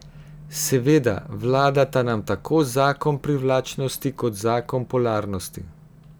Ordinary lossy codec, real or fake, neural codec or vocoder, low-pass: none; real; none; none